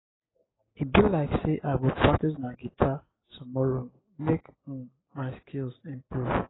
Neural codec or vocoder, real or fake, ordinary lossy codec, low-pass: none; real; AAC, 16 kbps; 7.2 kHz